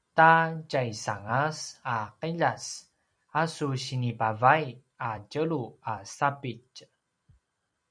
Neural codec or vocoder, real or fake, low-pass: vocoder, 44.1 kHz, 128 mel bands every 256 samples, BigVGAN v2; fake; 9.9 kHz